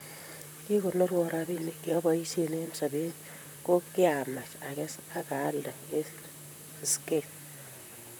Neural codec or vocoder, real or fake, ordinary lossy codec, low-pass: vocoder, 44.1 kHz, 128 mel bands, Pupu-Vocoder; fake; none; none